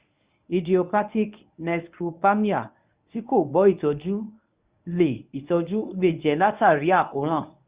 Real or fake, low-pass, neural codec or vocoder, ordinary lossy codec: fake; 3.6 kHz; codec, 24 kHz, 0.9 kbps, WavTokenizer, medium speech release version 1; Opus, 64 kbps